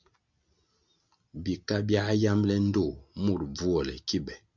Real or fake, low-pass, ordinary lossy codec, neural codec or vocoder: real; 7.2 kHz; Opus, 64 kbps; none